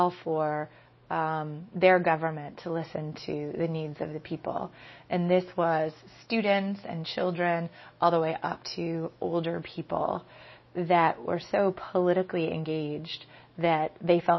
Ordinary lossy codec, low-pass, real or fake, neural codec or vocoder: MP3, 24 kbps; 7.2 kHz; fake; autoencoder, 48 kHz, 128 numbers a frame, DAC-VAE, trained on Japanese speech